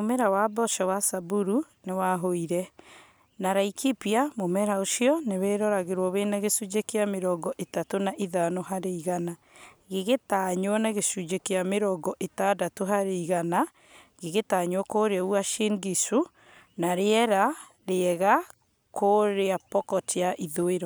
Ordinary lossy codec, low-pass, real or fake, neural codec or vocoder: none; none; real; none